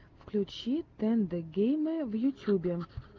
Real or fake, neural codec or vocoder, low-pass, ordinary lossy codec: real; none; 7.2 kHz; Opus, 32 kbps